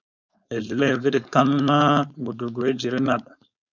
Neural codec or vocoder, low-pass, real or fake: codec, 16 kHz, 4.8 kbps, FACodec; 7.2 kHz; fake